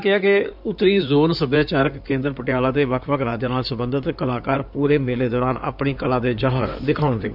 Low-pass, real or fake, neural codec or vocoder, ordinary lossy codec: 5.4 kHz; fake; codec, 16 kHz in and 24 kHz out, 2.2 kbps, FireRedTTS-2 codec; none